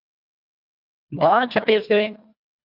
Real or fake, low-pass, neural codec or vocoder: fake; 5.4 kHz; codec, 24 kHz, 1.5 kbps, HILCodec